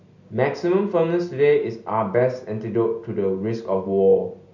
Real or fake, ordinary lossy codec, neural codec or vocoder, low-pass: real; none; none; 7.2 kHz